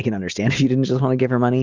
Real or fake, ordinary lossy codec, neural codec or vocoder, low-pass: real; Opus, 32 kbps; none; 7.2 kHz